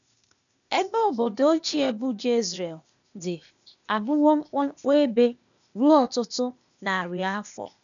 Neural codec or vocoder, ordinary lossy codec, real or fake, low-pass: codec, 16 kHz, 0.8 kbps, ZipCodec; none; fake; 7.2 kHz